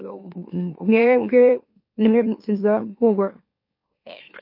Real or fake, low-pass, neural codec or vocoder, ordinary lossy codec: fake; 5.4 kHz; autoencoder, 44.1 kHz, a latent of 192 numbers a frame, MeloTTS; MP3, 32 kbps